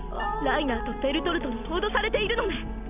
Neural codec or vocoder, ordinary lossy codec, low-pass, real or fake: none; none; 3.6 kHz; real